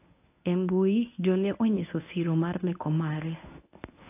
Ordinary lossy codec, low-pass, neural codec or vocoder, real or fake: AAC, 24 kbps; 3.6 kHz; codec, 24 kHz, 0.9 kbps, WavTokenizer, medium speech release version 1; fake